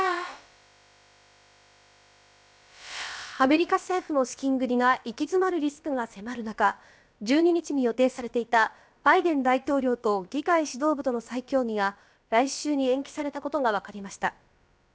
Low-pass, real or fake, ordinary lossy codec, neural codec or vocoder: none; fake; none; codec, 16 kHz, about 1 kbps, DyCAST, with the encoder's durations